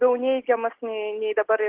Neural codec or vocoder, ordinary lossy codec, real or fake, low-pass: none; Opus, 16 kbps; real; 3.6 kHz